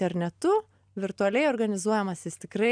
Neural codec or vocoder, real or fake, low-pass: none; real; 9.9 kHz